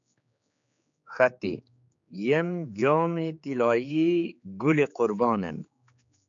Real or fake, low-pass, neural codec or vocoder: fake; 7.2 kHz; codec, 16 kHz, 4 kbps, X-Codec, HuBERT features, trained on general audio